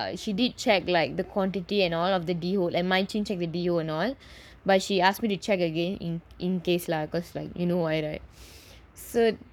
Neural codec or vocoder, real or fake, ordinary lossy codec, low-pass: codec, 44.1 kHz, 7.8 kbps, Pupu-Codec; fake; none; 19.8 kHz